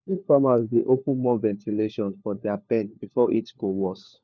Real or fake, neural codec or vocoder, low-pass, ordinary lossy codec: fake; codec, 16 kHz, 4 kbps, FunCodec, trained on LibriTTS, 50 frames a second; none; none